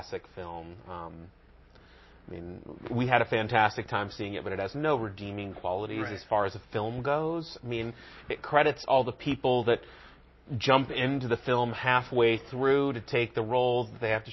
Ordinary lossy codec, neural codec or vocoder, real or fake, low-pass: MP3, 24 kbps; none; real; 7.2 kHz